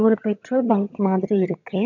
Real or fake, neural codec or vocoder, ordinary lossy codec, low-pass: fake; vocoder, 22.05 kHz, 80 mel bands, HiFi-GAN; none; 7.2 kHz